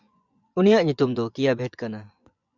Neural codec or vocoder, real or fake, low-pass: vocoder, 24 kHz, 100 mel bands, Vocos; fake; 7.2 kHz